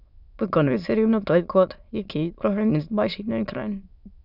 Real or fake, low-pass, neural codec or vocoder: fake; 5.4 kHz; autoencoder, 22.05 kHz, a latent of 192 numbers a frame, VITS, trained on many speakers